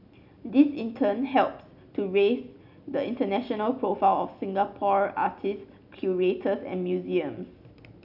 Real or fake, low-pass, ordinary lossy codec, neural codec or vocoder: real; 5.4 kHz; none; none